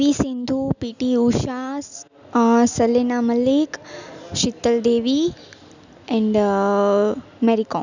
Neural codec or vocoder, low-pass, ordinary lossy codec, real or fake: none; 7.2 kHz; none; real